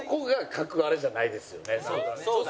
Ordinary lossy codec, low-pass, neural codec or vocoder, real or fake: none; none; none; real